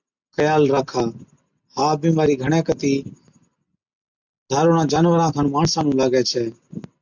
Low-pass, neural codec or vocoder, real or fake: 7.2 kHz; none; real